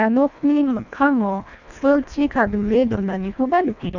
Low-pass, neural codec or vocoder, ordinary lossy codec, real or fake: 7.2 kHz; codec, 24 kHz, 1.5 kbps, HILCodec; none; fake